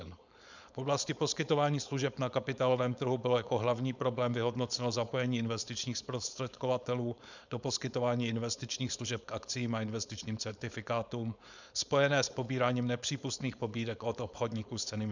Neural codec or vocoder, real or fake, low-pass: codec, 16 kHz, 4.8 kbps, FACodec; fake; 7.2 kHz